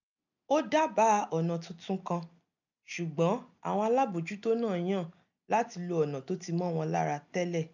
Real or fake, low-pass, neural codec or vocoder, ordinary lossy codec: real; 7.2 kHz; none; none